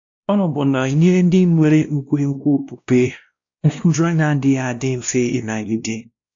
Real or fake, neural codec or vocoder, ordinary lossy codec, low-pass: fake; codec, 16 kHz, 1 kbps, X-Codec, WavLM features, trained on Multilingual LibriSpeech; AAC, 64 kbps; 7.2 kHz